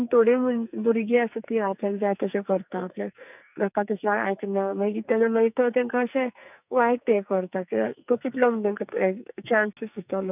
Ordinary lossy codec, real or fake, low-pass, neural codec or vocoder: none; fake; 3.6 kHz; codec, 44.1 kHz, 2.6 kbps, SNAC